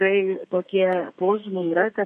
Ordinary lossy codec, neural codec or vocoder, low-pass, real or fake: MP3, 48 kbps; codec, 32 kHz, 1.9 kbps, SNAC; 14.4 kHz; fake